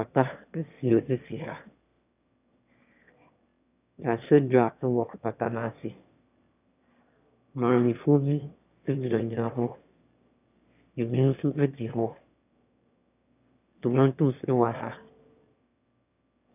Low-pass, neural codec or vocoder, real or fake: 3.6 kHz; autoencoder, 22.05 kHz, a latent of 192 numbers a frame, VITS, trained on one speaker; fake